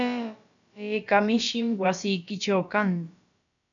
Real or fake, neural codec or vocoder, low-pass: fake; codec, 16 kHz, about 1 kbps, DyCAST, with the encoder's durations; 7.2 kHz